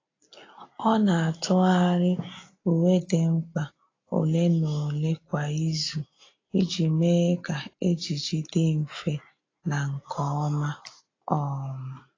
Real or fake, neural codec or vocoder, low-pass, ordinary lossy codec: real; none; 7.2 kHz; AAC, 32 kbps